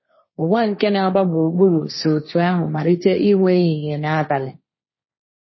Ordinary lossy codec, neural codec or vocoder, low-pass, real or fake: MP3, 24 kbps; codec, 16 kHz, 1.1 kbps, Voila-Tokenizer; 7.2 kHz; fake